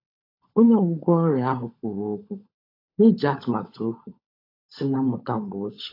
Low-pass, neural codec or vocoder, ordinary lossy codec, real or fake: 5.4 kHz; codec, 16 kHz, 16 kbps, FunCodec, trained on LibriTTS, 50 frames a second; AAC, 32 kbps; fake